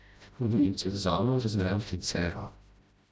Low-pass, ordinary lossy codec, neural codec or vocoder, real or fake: none; none; codec, 16 kHz, 0.5 kbps, FreqCodec, smaller model; fake